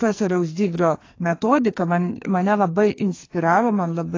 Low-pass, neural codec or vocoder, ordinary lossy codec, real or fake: 7.2 kHz; codec, 44.1 kHz, 2.6 kbps, SNAC; AAC, 32 kbps; fake